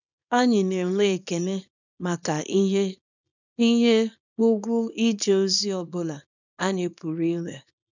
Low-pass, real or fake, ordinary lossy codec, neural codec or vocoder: 7.2 kHz; fake; none; codec, 24 kHz, 0.9 kbps, WavTokenizer, small release